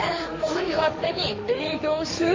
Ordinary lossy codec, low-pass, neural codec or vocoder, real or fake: MP3, 32 kbps; 7.2 kHz; codec, 16 kHz, 1.1 kbps, Voila-Tokenizer; fake